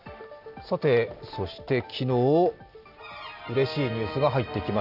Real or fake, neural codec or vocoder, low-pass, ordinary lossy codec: real; none; 5.4 kHz; none